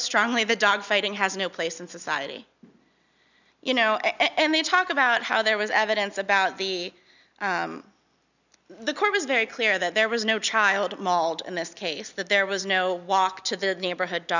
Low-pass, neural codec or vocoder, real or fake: 7.2 kHz; none; real